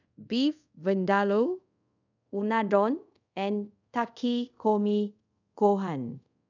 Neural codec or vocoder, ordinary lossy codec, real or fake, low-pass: codec, 24 kHz, 0.5 kbps, DualCodec; none; fake; 7.2 kHz